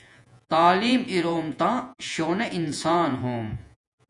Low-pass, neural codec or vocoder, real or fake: 10.8 kHz; vocoder, 48 kHz, 128 mel bands, Vocos; fake